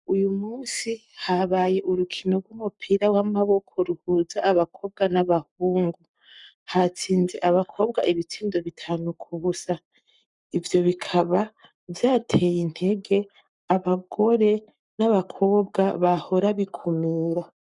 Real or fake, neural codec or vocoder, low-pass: fake; codec, 44.1 kHz, 7.8 kbps, Pupu-Codec; 10.8 kHz